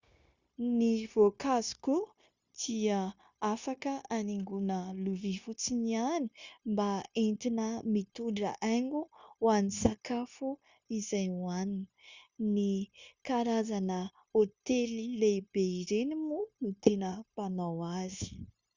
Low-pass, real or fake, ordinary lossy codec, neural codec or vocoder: 7.2 kHz; fake; Opus, 64 kbps; codec, 16 kHz, 0.9 kbps, LongCat-Audio-Codec